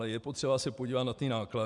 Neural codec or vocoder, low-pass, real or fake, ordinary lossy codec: none; 10.8 kHz; real; MP3, 96 kbps